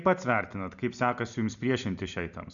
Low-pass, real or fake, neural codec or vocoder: 7.2 kHz; real; none